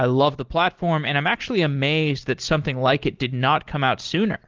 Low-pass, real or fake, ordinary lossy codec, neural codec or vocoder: 7.2 kHz; real; Opus, 16 kbps; none